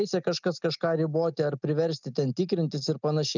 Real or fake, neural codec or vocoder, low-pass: real; none; 7.2 kHz